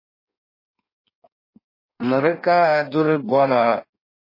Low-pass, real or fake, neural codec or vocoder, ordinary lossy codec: 5.4 kHz; fake; codec, 16 kHz in and 24 kHz out, 1.1 kbps, FireRedTTS-2 codec; MP3, 24 kbps